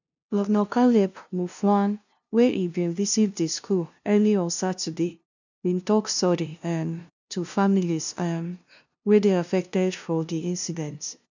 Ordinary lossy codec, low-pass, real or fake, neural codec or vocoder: none; 7.2 kHz; fake; codec, 16 kHz, 0.5 kbps, FunCodec, trained on LibriTTS, 25 frames a second